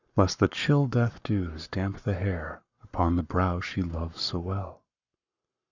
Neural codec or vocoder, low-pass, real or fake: codec, 44.1 kHz, 7.8 kbps, Pupu-Codec; 7.2 kHz; fake